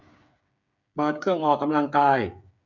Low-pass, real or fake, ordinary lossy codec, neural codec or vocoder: 7.2 kHz; fake; none; codec, 16 kHz, 8 kbps, FreqCodec, smaller model